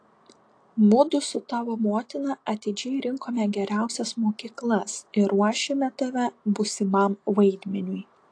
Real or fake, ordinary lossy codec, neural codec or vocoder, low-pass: real; AAC, 48 kbps; none; 9.9 kHz